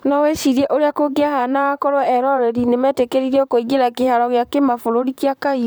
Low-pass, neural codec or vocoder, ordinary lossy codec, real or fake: none; vocoder, 44.1 kHz, 128 mel bands, Pupu-Vocoder; none; fake